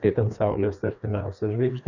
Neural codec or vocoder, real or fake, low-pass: codec, 16 kHz, 2 kbps, FreqCodec, larger model; fake; 7.2 kHz